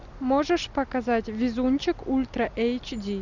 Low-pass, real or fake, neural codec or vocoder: 7.2 kHz; real; none